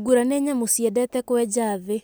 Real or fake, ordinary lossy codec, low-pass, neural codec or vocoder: real; none; none; none